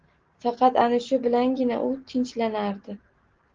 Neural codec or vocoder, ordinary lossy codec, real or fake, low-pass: none; Opus, 16 kbps; real; 7.2 kHz